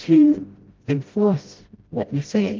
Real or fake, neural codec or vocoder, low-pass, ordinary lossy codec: fake; codec, 16 kHz, 0.5 kbps, FreqCodec, smaller model; 7.2 kHz; Opus, 32 kbps